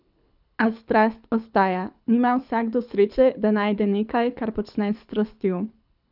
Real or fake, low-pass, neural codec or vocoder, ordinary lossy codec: fake; 5.4 kHz; codec, 24 kHz, 6 kbps, HILCodec; none